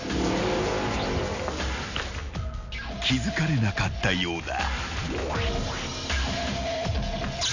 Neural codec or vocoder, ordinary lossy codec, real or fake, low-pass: none; none; real; 7.2 kHz